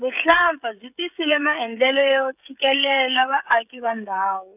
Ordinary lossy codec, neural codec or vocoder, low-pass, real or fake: none; codec, 16 kHz, 16 kbps, FreqCodec, smaller model; 3.6 kHz; fake